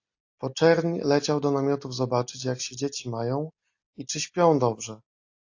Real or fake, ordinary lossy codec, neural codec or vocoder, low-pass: real; AAC, 48 kbps; none; 7.2 kHz